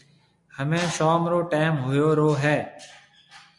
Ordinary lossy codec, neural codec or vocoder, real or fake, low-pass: MP3, 64 kbps; none; real; 10.8 kHz